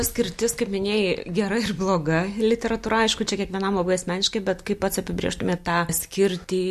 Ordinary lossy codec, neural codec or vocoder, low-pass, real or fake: MP3, 64 kbps; none; 14.4 kHz; real